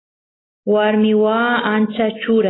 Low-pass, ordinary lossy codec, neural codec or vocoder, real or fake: 7.2 kHz; AAC, 16 kbps; none; real